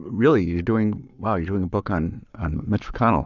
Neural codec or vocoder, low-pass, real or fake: codec, 44.1 kHz, 7.8 kbps, Pupu-Codec; 7.2 kHz; fake